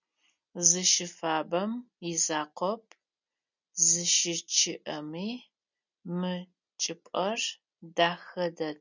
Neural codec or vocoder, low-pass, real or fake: none; 7.2 kHz; real